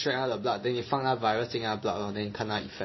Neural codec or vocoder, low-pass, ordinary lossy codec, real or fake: none; 7.2 kHz; MP3, 24 kbps; real